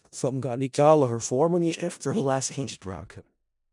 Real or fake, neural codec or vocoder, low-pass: fake; codec, 16 kHz in and 24 kHz out, 0.4 kbps, LongCat-Audio-Codec, four codebook decoder; 10.8 kHz